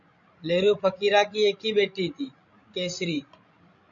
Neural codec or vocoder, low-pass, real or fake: codec, 16 kHz, 16 kbps, FreqCodec, larger model; 7.2 kHz; fake